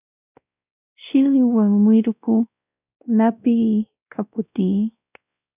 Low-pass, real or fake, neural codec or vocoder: 3.6 kHz; fake; codec, 16 kHz, 1 kbps, X-Codec, WavLM features, trained on Multilingual LibriSpeech